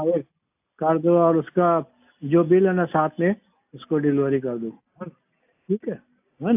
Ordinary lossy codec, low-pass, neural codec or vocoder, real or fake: AAC, 32 kbps; 3.6 kHz; none; real